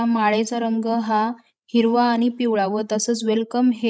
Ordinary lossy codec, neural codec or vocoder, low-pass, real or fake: none; codec, 16 kHz, 16 kbps, FreqCodec, larger model; none; fake